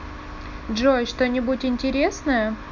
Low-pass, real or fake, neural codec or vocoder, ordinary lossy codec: 7.2 kHz; real; none; none